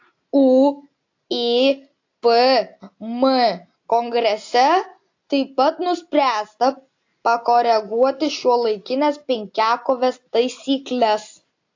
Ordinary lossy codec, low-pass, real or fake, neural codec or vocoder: AAC, 48 kbps; 7.2 kHz; real; none